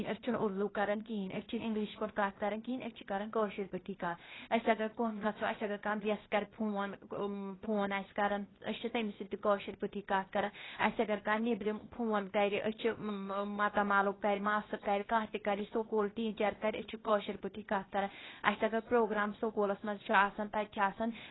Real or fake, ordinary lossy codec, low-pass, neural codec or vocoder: fake; AAC, 16 kbps; 7.2 kHz; codec, 16 kHz, 0.8 kbps, ZipCodec